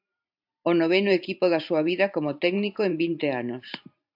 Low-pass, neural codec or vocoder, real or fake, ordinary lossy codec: 5.4 kHz; vocoder, 44.1 kHz, 128 mel bands every 512 samples, BigVGAN v2; fake; AAC, 48 kbps